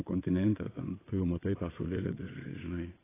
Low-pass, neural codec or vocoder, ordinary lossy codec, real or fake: 3.6 kHz; vocoder, 22.05 kHz, 80 mel bands, WaveNeXt; AAC, 16 kbps; fake